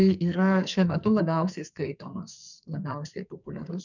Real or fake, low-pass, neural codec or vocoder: fake; 7.2 kHz; codec, 44.1 kHz, 2.6 kbps, SNAC